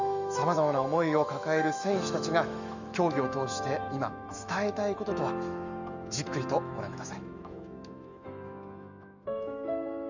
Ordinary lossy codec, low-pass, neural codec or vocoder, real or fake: none; 7.2 kHz; autoencoder, 48 kHz, 128 numbers a frame, DAC-VAE, trained on Japanese speech; fake